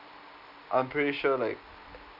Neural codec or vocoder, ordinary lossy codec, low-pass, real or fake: none; none; 5.4 kHz; real